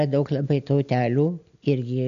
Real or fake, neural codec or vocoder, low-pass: real; none; 7.2 kHz